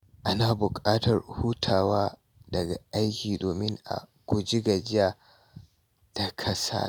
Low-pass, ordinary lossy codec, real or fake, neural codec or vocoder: none; none; real; none